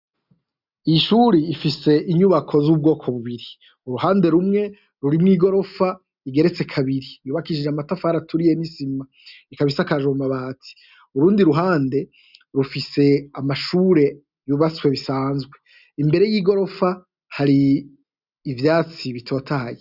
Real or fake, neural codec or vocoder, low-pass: real; none; 5.4 kHz